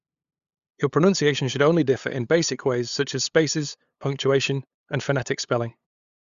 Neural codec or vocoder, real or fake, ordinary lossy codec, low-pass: codec, 16 kHz, 8 kbps, FunCodec, trained on LibriTTS, 25 frames a second; fake; Opus, 64 kbps; 7.2 kHz